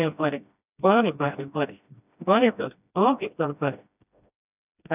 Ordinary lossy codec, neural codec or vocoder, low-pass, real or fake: none; codec, 16 kHz, 1 kbps, FreqCodec, smaller model; 3.6 kHz; fake